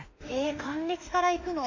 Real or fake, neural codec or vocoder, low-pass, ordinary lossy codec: fake; autoencoder, 48 kHz, 32 numbers a frame, DAC-VAE, trained on Japanese speech; 7.2 kHz; AAC, 48 kbps